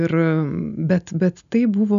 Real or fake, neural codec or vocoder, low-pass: real; none; 7.2 kHz